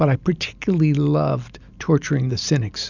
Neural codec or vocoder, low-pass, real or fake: none; 7.2 kHz; real